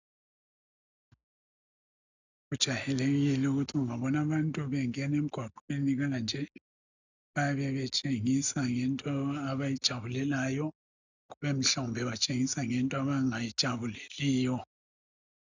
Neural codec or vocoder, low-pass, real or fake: none; 7.2 kHz; real